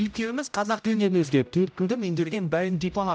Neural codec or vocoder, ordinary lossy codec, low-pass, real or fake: codec, 16 kHz, 0.5 kbps, X-Codec, HuBERT features, trained on general audio; none; none; fake